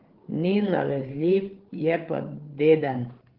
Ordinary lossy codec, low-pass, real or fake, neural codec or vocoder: Opus, 16 kbps; 5.4 kHz; fake; codec, 16 kHz, 8 kbps, FreqCodec, larger model